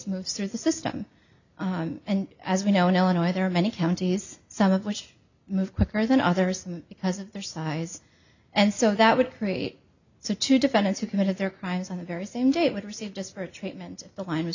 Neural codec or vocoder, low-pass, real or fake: none; 7.2 kHz; real